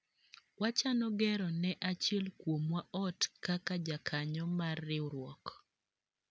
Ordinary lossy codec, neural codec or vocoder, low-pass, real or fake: none; none; none; real